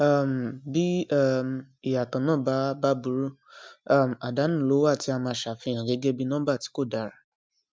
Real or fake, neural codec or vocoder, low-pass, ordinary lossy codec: real; none; none; none